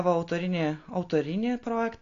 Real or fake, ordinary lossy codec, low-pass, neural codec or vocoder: real; AAC, 48 kbps; 7.2 kHz; none